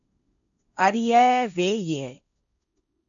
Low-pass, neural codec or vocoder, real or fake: 7.2 kHz; codec, 16 kHz, 1.1 kbps, Voila-Tokenizer; fake